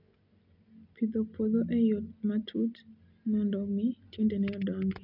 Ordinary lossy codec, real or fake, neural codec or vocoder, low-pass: none; real; none; 5.4 kHz